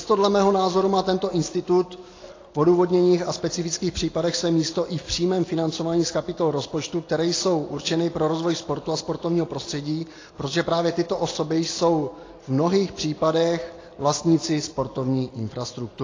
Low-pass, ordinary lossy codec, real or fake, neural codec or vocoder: 7.2 kHz; AAC, 32 kbps; real; none